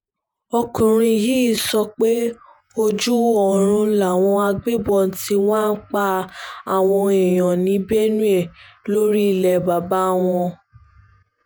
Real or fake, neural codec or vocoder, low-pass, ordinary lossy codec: fake; vocoder, 48 kHz, 128 mel bands, Vocos; none; none